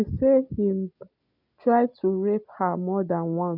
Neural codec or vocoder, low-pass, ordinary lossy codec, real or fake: none; 5.4 kHz; none; real